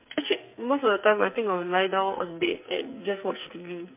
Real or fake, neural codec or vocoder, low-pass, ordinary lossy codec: fake; codec, 44.1 kHz, 2.6 kbps, SNAC; 3.6 kHz; MP3, 32 kbps